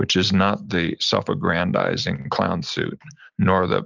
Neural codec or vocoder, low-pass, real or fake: none; 7.2 kHz; real